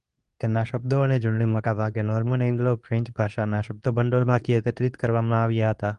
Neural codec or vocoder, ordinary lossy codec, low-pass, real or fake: codec, 24 kHz, 0.9 kbps, WavTokenizer, medium speech release version 2; Opus, 32 kbps; 10.8 kHz; fake